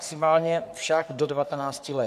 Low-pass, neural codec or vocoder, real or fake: 14.4 kHz; codec, 44.1 kHz, 3.4 kbps, Pupu-Codec; fake